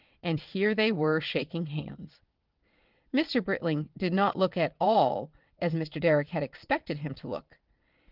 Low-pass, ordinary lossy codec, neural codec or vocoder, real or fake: 5.4 kHz; Opus, 32 kbps; vocoder, 22.05 kHz, 80 mel bands, WaveNeXt; fake